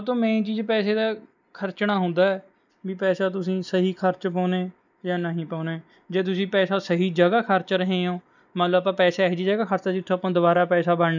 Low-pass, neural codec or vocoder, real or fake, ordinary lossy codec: 7.2 kHz; none; real; none